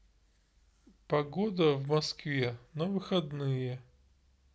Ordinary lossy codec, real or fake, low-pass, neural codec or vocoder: none; real; none; none